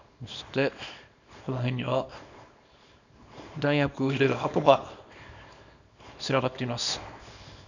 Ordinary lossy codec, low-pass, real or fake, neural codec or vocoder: none; 7.2 kHz; fake; codec, 24 kHz, 0.9 kbps, WavTokenizer, small release